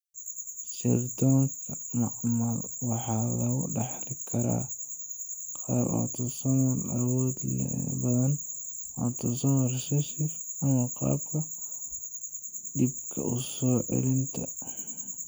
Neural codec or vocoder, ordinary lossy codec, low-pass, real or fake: none; none; none; real